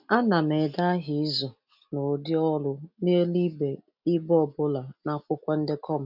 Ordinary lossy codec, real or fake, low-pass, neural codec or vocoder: none; real; 5.4 kHz; none